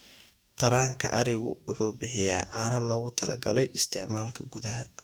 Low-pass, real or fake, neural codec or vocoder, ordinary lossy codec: none; fake; codec, 44.1 kHz, 2.6 kbps, DAC; none